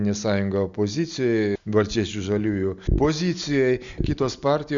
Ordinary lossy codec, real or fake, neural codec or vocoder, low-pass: Opus, 64 kbps; real; none; 7.2 kHz